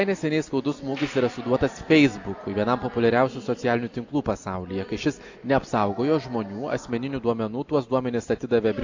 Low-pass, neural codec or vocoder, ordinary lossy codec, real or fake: 7.2 kHz; none; AAC, 48 kbps; real